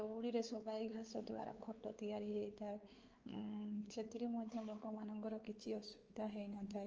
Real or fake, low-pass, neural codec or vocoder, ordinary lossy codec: fake; 7.2 kHz; codec, 16 kHz, 4 kbps, X-Codec, WavLM features, trained on Multilingual LibriSpeech; Opus, 24 kbps